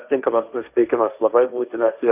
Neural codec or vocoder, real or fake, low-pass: codec, 16 kHz, 1.1 kbps, Voila-Tokenizer; fake; 3.6 kHz